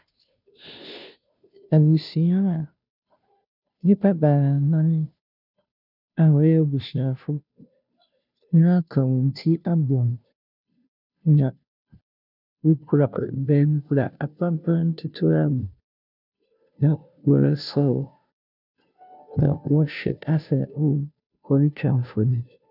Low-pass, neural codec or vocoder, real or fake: 5.4 kHz; codec, 16 kHz, 0.5 kbps, FunCodec, trained on Chinese and English, 25 frames a second; fake